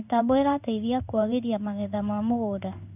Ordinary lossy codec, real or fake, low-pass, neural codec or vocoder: none; fake; 3.6 kHz; codec, 16 kHz in and 24 kHz out, 1 kbps, XY-Tokenizer